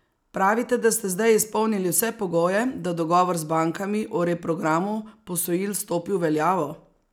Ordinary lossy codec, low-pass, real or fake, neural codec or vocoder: none; none; real; none